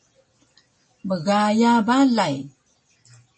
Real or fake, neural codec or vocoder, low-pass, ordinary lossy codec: real; none; 9.9 kHz; MP3, 32 kbps